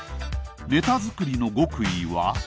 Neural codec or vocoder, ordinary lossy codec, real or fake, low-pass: none; none; real; none